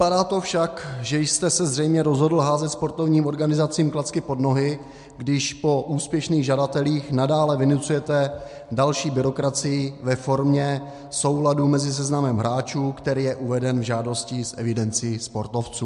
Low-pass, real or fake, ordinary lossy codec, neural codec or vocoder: 10.8 kHz; real; MP3, 64 kbps; none